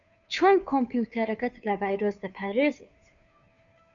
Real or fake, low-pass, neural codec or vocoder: fake; 7.2 kHz; codec, 16 kHz, 2 kbps, FunCodec, trained on Chinese and English, 25 frames a second